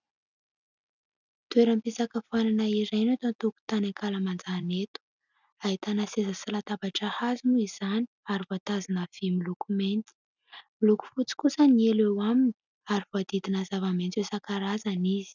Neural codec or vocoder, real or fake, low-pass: none; real; 7.2 kHz